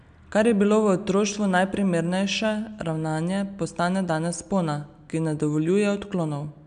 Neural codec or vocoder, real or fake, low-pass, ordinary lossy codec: none; real; 9.9 kHz; AAC, 96 kbps